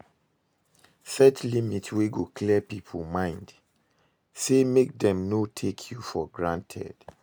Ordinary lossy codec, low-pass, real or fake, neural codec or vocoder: none; none; real; none